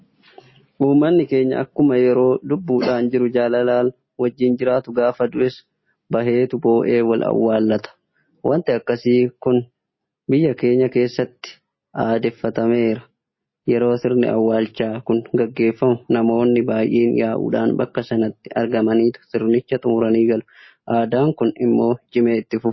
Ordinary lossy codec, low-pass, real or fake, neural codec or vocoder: MP3, 24 kbps; 7.2 kHz; real; none